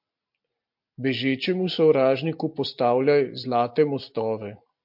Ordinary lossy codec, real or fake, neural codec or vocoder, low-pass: MP3, 48 kbps; real; none; 5.4 kHz